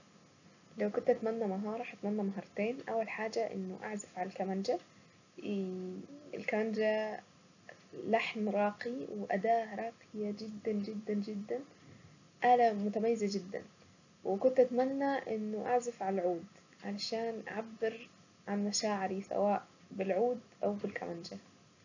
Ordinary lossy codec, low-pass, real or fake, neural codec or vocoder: none; 7.2 kHz; real; none